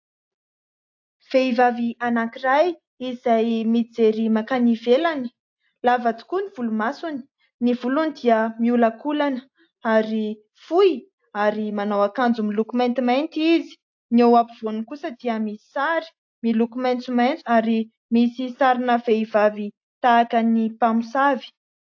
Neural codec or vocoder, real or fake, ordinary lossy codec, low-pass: none; real; AAC, 48 kbps; 7.2 kHz